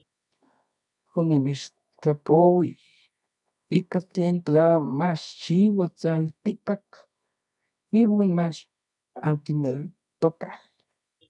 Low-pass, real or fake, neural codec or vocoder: 10.8 kHz; fake; codec, 24 kHz, 0.9 kbps, WavTokenizer, medium music audio release